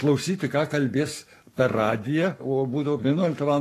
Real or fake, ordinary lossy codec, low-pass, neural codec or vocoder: fake; AAC, 48 kbps; 14.4 kHz; codec, 44.1 kHz, 7.8 kbps, Pupu-Codec